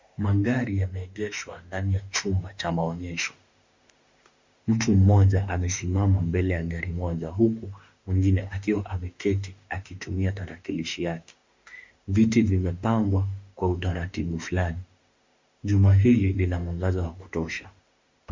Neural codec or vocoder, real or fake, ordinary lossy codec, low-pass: autoencoder, 48 kHz, 32 numbers a frame, DAC-VAE, trained on Japanese speech; fake; MP3, 64 kbps; 7.2 kHz